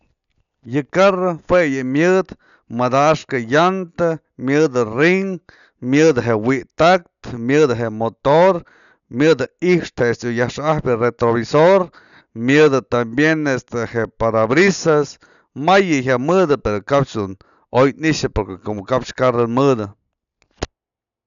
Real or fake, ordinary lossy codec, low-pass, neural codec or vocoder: real; none; 7.2 kHz; none